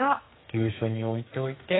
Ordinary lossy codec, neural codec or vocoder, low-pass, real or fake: AAC, 16 kbps; codec, 44.1 kHz, 2.6 kbps, SNAC; 7.2 kHz; fake